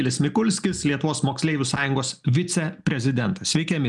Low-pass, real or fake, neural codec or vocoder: 10.8 kHz; real; none